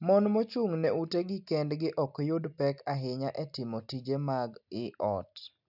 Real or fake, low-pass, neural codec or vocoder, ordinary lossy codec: real; 5.4 kHz; none; none